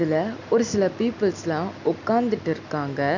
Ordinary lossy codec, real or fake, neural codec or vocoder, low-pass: none; real; none; 7.2 kHz